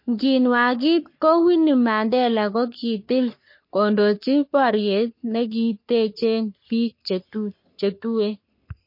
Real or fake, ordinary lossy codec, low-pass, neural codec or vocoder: fake; MP3, 32 kbps; 5.4 kHz; codec, 44.1 kHz, 3.4 kbps, Pupu-Codec